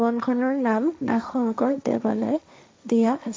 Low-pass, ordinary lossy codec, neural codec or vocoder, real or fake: none; none; codec, 16 kHz, 1.1 kbps, Voila-Tokenizer; fake